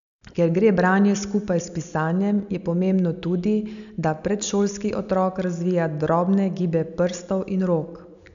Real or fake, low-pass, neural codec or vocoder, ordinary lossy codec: real; 7.2 kHz; none; none